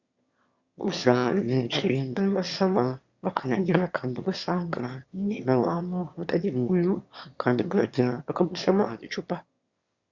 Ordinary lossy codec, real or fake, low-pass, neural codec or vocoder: Opus, 64 kbps; fake; 7.2 kHz; autoencoder, 22.05 kHz, a latent of 192 numbers a frame, VITS, trained on one speaker